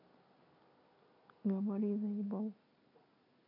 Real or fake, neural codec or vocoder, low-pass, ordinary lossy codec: real; none; 5.4 kHz; none